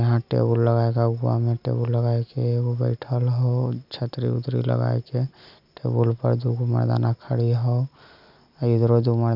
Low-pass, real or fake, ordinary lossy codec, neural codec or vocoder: 5.4 kHz; real; none; none